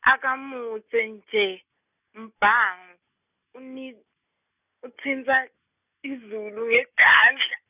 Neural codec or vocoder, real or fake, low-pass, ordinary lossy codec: none; real; 3.6 kHz; none